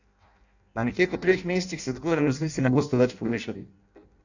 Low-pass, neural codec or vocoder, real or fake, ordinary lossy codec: 7.2 kHz; codec, 16 kHz in and 24 kHz out, 0.6 kbps, FireRedTTS-2 codec; fake; none